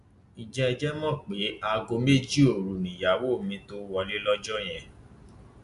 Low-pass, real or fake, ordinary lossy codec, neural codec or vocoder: 10.8 kHz; real; none; none